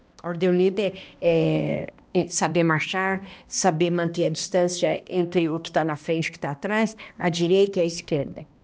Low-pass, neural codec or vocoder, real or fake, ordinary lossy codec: none; codec, 16 kHz, 1 kbps, X-Codec, HuBERT features, trained on balanced general audio; fake; none